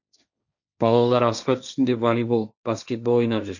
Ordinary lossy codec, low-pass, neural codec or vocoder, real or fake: none; 7.2 kHz; codec, 16 kHz, 1.1 kbps, Voila-Tokenizer; fake